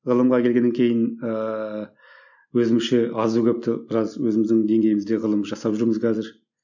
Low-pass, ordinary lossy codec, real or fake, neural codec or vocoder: 7.2 kHz; none; real; none